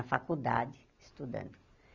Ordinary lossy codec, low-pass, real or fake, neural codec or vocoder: none; 7.2 kHz; real; none